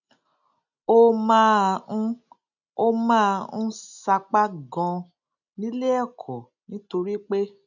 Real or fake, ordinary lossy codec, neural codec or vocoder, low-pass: real; none; none; 7.2 kHz